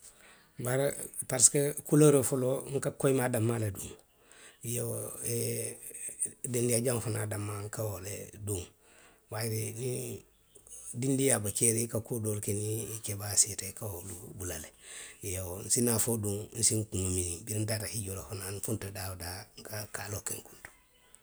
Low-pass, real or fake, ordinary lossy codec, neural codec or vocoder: none; real; none; none